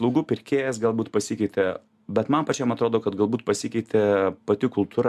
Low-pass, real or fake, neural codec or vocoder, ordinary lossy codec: 14.4 kHz; real; none; MP3, 96 kbps